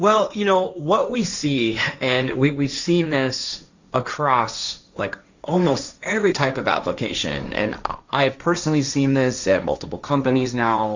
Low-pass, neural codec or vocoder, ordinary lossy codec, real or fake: 7.2 kHz; codec, 16 kHz, 1.1 kbps, Voila-Tokenizer; Opus, 64 kbps; fake